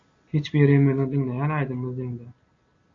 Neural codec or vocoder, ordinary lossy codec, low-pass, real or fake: none; AAC, 48 kbps; 7.2 kHz; real